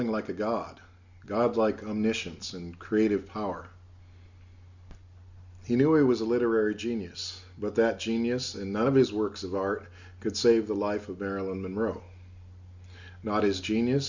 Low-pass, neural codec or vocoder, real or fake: 7.2 kHz; none; real